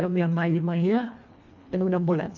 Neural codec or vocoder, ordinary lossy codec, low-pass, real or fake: codec, 24 kHz, 1.5 kbps, HILCodec; AAC, 48 kbps; 7.2 kHz; fake